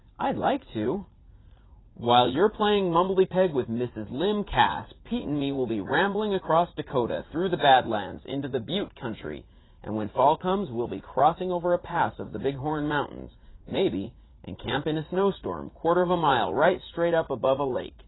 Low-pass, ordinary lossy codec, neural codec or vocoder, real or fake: 7.2 kHz; AAC, 16 kbps; vocoder, 44.1 kHz, 80 mel bands, Vocos; fake